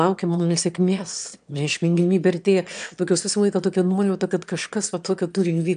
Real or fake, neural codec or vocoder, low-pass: fake; autoencoder, 22.05 kHz, a latent of 192 numbers a frame, VITS, trained on one speaker; 9.9 kHz